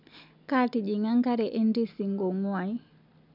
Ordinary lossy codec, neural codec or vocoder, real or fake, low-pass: none; none; real; 5.4 kHz